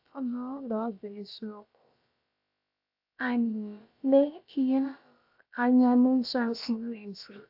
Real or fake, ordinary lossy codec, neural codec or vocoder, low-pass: fake; AAC, 48 kbps; codec, 16 kHz, about 1 kbps, DyCAST, with the encoder's durations; 5.4 kHz